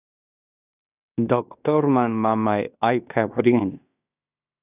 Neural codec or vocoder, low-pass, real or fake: codec, 16 kHz in and 24 kHz out, 0.9 kbps, LongCat-Audio-Codec, fine tuned four codebook decoder; 3.6 kHz; fake